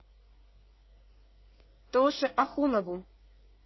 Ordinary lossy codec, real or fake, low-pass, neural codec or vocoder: MP3, 24 kbps; fake; 7.2 kHz; codec, 24 kHz, 1 kbps, SNAC